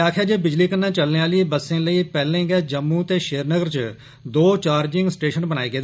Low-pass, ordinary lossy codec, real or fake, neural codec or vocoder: none; none; real; none